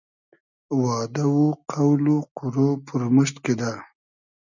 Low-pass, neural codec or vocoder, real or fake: 7.2 kHz; none; real